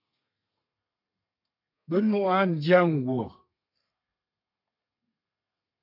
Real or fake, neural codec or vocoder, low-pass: fake; codec, 32 kHz, 1.9 kbps, SNAC; 5.4 kHz